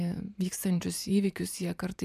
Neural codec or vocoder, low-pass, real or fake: vocoder, 44.1 kHz, 128 mel bands every 512 samples, BigVGAN v2; 14.4 kHz; fake